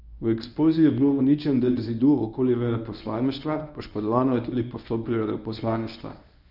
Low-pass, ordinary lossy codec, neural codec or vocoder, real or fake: 5.4 kHz; none; codec, 24 kHz, 0.9 kbps, WavTokenizer, medium speech release version 1; fake